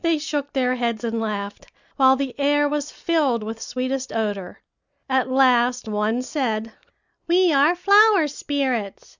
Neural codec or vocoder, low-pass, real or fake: none; 7.2 kHz; real